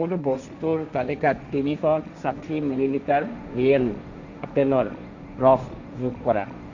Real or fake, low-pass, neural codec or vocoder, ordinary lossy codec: fake; 7.2 kHz; codec, 16 kHz, 1.1 kbps, Voila-Tokenizer; none